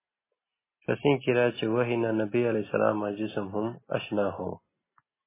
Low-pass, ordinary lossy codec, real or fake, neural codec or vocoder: 3.6 kHz; MP3, 16 kbps; real; none